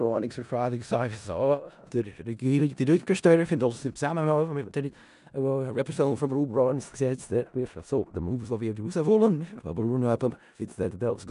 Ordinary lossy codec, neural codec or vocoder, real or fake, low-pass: none; codec, 16 kHz in and 24 kHz out, 0.4 kbps, LongCat-Audio-Codec, four codebook decoder; fake; 10.8 kHz